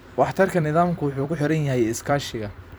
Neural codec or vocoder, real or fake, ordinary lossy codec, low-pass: vocoder, 44.1 kHz, 128 mel bands every 256 samples, BigVGAN v2; fake; none; none